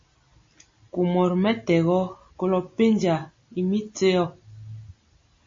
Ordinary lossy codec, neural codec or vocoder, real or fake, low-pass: MP3, 32 kbps; none; real; 7.2 kHz